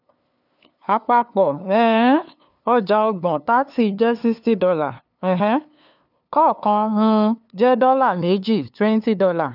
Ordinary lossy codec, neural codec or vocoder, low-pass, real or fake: none; codec, 16 kHz, 2 kbps, FunCodec, trained on LibriTTS, 25 frames a second; 5.4 kHz; fake